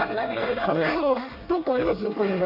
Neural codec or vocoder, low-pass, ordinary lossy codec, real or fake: codec, 24 kHz, 1 kbps, SNAC; 5.4 kHz; none; fake